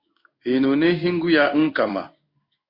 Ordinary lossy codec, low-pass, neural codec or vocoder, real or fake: Opus, 64 kbps; 5.4 kHz; codec, 16 kHz in and 24 kHz out, 1 kbps, XY-Tokenizer; fake